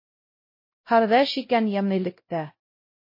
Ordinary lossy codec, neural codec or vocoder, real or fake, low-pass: MP3, 24 kbps; codec, 16 kHz, 0.5 kbps, X-Codec, WavLM features, trained on Multilingual LibriSpeech; fake; 5.4 kHz